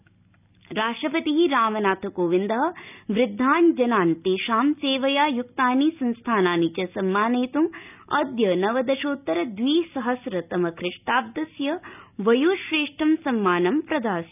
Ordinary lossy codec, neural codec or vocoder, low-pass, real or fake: none; none; 3.6 kHz; real